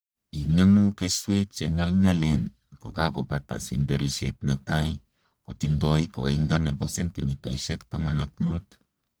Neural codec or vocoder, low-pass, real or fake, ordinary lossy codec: codec, 44.1 kHz, 1.7 kbps, Pupu-Codec; none; fake; none